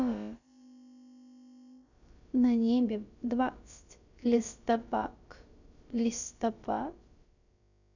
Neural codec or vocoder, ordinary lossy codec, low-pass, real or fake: codec, 16 kHz, about 1 kbps, DyCAST, with the encoder's durations; none; 7.2 kHz; fake